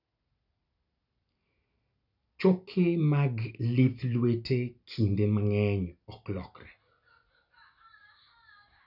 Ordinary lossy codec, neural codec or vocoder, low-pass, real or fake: none; none; 5.4 kHz; real